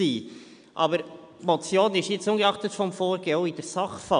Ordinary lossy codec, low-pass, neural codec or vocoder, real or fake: none; 9.9 kHz; none; real